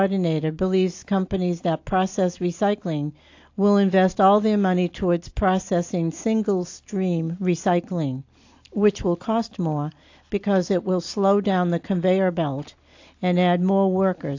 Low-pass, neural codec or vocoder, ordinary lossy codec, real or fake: 7.2 kHz; none; AAC, 48 kbps; real